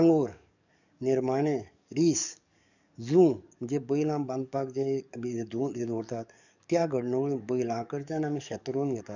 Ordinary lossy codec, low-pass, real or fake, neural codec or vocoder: none; 7.2 kHz; fake; codec, 44.1 kHz, 7.8 kbps, DAC